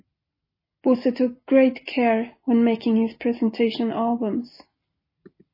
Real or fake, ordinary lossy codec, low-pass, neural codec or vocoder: real; MP3, 24 kbps; 5.4 kHz; none